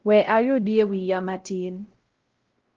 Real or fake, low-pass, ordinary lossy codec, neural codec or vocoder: fake; 7.2 kHz; Opus, 16 kbps; codec, 16 kHz, 0.5 kbps, X-Codec, WavLM features, trained on Multilingual LibriSpeech